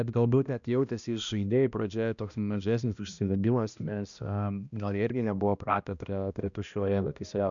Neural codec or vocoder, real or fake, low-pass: codec, 16 kHz, 1 kbps, X-Codec, HuBERT features, trained on balanced general audio; fake; 7.2 kHz